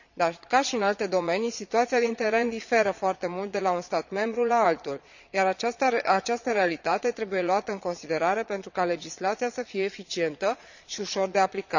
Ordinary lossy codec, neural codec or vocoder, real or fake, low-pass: none; vocoder, 22.05 kHz, 80 mel bands, Vocos; fake; 7.2 kHz